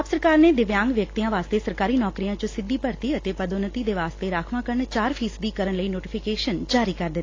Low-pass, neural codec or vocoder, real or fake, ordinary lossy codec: 7.2 kHz; none; real; AAC, 32 kbps